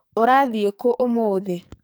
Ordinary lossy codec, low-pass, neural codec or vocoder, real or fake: none; none; codec, 44.1 kHz, 2.6 kbps, SNAC; fake